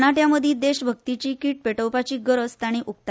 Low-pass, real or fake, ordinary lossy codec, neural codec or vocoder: none; real; none; none